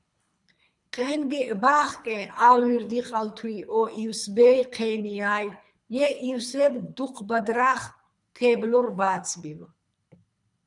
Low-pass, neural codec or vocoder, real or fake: 10.8 kHz; codec, 24 kHz, 3 kbps, HILCodec; fake